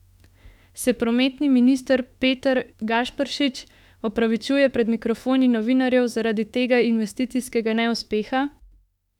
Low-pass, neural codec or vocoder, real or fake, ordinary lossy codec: 19.8 kHz; autoencoder, 48 kHz, 32 numbers a frame, DAC-VAE, trained on Japanese speech; fake; none